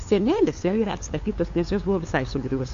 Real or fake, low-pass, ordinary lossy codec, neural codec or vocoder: fake; 7.2 kHz; AAC, 48 kbps; codec, 16 kHz, 2 kbps, FunCodec, trained on LibriTTS, 25 frames a second